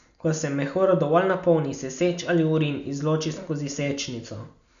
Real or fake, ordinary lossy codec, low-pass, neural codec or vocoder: real; none; 7.2 kHz; none